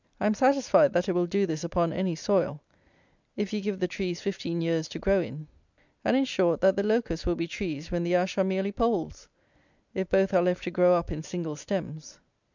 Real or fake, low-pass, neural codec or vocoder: real; 7.2 kHz; none